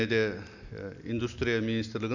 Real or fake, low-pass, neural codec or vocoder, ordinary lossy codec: real; 7.2 kHz; none; none